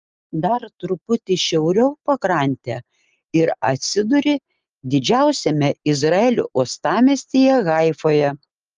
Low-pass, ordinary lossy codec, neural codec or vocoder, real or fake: 7.2 kHz; Opus, 24 kbps; none; real